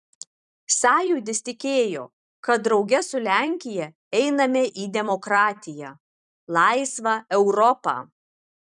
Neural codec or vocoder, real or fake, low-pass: vocoder, 44.1 kHz, 128 mel bands every 256 samples, BigVGAN v2; fake; 10.8 kHz